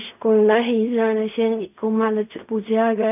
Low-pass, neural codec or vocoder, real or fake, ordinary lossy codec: 3.6 kHz; codec, 16 kHz in and 24 kHz out, 0.4 kbps, LongCat-Audio-Codec, fine tuned four codebook decoder; fake; none